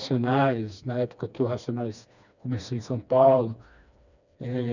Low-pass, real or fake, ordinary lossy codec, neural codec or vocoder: 7.2 kHz; fake; none; codec, 16 kHz, 2 kbps, FreqCodec, smaller model